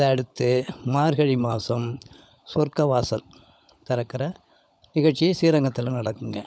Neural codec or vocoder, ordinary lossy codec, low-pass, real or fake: codec, 16 kHz, 16 kbps, FunCodec, trained on LibriTTS, 50 frames a second; none; none; fake